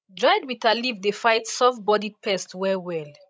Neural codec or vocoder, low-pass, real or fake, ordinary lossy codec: codec, 16 kHz, 16 kbps, FreqCodec, larger model; none; fake; none